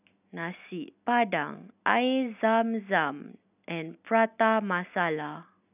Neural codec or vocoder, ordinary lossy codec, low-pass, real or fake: none; none; 3.6 kHz; real